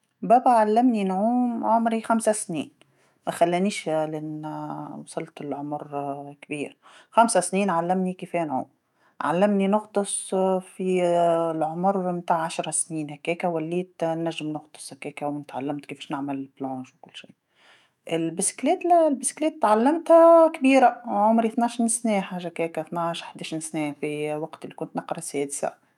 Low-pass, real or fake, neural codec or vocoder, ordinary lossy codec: 19.8 kHz; fake; autoencoder, 48 kHz, 128 numbers a frame, DAC-VAE, trained on Japanese speech; none